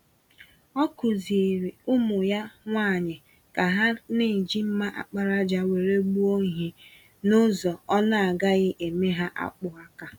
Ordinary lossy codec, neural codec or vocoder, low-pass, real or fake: none; none; 19.8 kHz; real